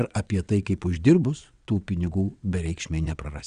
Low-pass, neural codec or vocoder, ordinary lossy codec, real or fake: 9.9 kHz; vocoder, 22.05 kHz, 80 mel bands, WaveNeXt; Opus, 64 kbps; fake